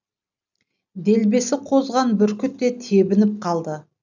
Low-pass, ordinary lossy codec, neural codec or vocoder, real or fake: 7.2 kHz; none; none; real